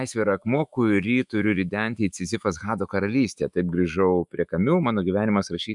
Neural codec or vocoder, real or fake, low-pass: autoencoder, 48 kHz, 128 numbers a frame, DAC-VAE, trained on Japanese speech; fake; 10.8 kHz